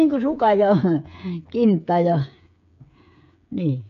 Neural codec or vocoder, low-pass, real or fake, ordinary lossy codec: codec, 16 kHz, 8 kbps, FreqCodec, smaller model; 7.2 kHz; fake; AAC, 96 kbps